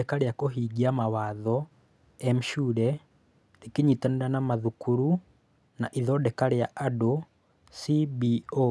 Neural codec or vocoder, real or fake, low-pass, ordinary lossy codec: none; real; none; none